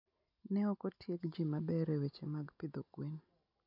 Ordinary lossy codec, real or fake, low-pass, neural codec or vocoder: none; real; 5.4 kHz; none